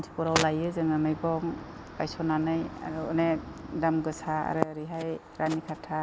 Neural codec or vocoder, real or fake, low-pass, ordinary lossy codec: none; real; none; none